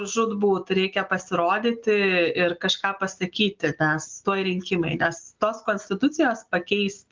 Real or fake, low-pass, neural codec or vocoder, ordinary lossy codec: real; 7.2 kHz; none; Opus, 24 kbps